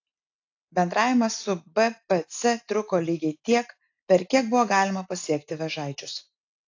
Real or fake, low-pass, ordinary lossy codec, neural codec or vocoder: real; 7.2 kHz; AAC, 48 kbps; none